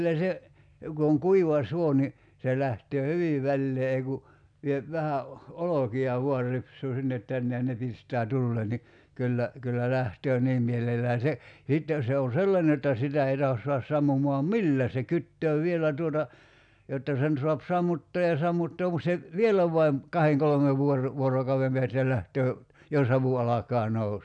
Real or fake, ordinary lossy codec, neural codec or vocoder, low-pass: real; none; none; 9.9 kHz